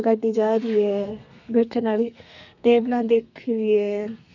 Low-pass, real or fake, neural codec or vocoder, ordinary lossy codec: 7.2 kHz; fake; codec, 32 kHz, 1.9 kbps, SNAC; none